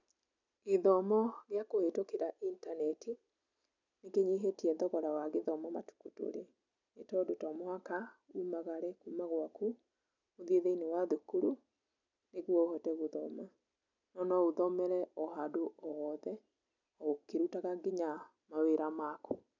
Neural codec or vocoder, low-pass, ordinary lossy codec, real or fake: none; 7.2 kHz; none; real